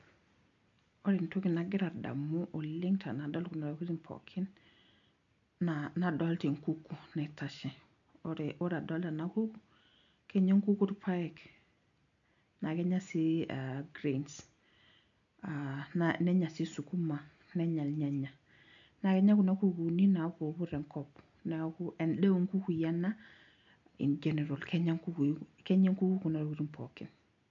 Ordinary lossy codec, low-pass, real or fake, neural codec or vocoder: MP3, 96 kbps; 7.2 kHz; real; none